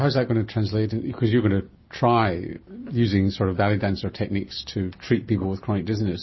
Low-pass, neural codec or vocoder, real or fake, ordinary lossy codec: 7.2 kHz; none; real; MP3, 24 kbps